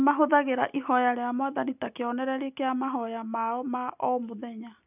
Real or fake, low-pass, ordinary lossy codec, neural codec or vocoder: real; 3.6 kHz; none; none